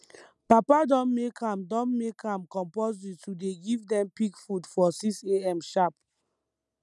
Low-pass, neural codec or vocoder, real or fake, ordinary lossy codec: none; none; real; none